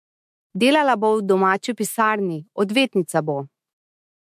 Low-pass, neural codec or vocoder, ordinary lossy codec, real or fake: 14.4 kHz; autoencoder, 48 kHz, 128 numbers a frame, DAC-VAE, trained on Japanese speech; MP3, 64 kbps; fake